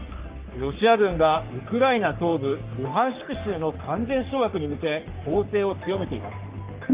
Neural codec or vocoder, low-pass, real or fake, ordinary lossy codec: codec, 44.1 kHz, 3.4 kbps, Pupu-Codec; 3.6 kHz; fake; Opus, 32 kbps